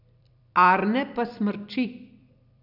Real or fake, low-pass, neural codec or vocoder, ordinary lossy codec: fake; 5.4 kHz; vocoder, 44.1 kHz, 128 mel bands every 256 samples, BigVGAN v2; none